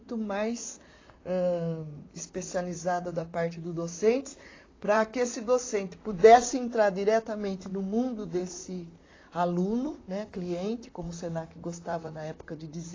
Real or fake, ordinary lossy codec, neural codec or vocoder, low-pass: fake; AAC, 32 kbps; vocoder, 44.1 kHz, 128 mel bands, Pupu-Vocoder; 7.2 kHz